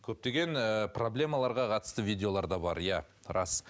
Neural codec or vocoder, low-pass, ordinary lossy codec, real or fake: none; none; none; real